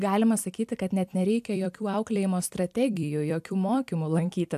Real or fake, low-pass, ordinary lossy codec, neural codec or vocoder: fake; 14.4 kHz; AAC, 96 kbps; vocoder, 44.1 kHz, 128 mel bands every 256 samples, BigVGAN v2